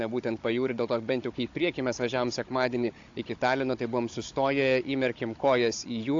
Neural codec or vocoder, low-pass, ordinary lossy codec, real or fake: codec, 16 kHz, 16 kbps, FunCodec, trained on Chinese and English, 50 frames a second; 7.2 kHz; AAC, 48 kbps; fake